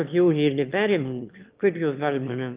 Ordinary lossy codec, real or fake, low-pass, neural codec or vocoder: Opus, 24 kbps; fake; 3.6 kHz; autoencoder, 22.05 kHz, a latent of 192 numbers a frame, VITS, trained on one speaker